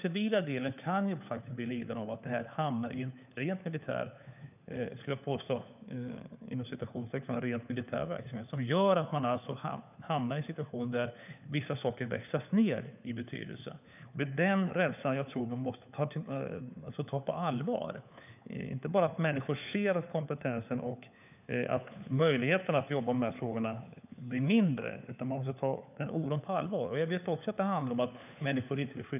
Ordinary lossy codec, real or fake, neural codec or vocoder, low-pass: none; fake; codec, 16 kHz, 4 kbps, FunCodec, trained on LibriTTS, 50 frames a second; 3.6 kHz